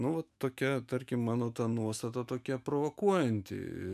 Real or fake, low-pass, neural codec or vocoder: fake; 14.4 kHz; autoencoder, 48 kHz, 128 numbers a frame, DAC-VAE, trained on Japanese speech